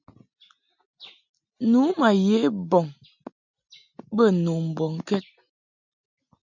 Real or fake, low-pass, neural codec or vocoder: real; 7.2 kHz; none